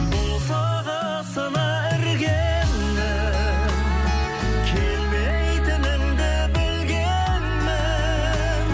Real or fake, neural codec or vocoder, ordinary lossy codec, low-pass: real; none; none; none